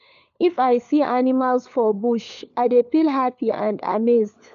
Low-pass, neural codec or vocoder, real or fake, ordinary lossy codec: 7.2 kHz; codec, 16 kHz, 4 kbps, FunCodec, trained on LibriTTS, 50 frames a second; fake; none